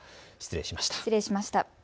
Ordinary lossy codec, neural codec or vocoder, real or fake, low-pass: none; none; real; none